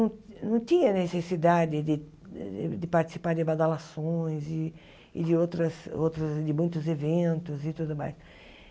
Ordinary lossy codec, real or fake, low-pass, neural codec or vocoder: none; real; none; none